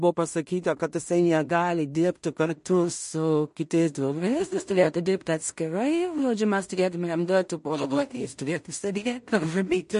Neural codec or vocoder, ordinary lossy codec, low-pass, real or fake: codec, 16 kHz in and 24 kHz out, 0.4 kbps, LongCat-Audio-Codec, two codebook decoder; MP3, 48 kbps; 10.8 kHz; fake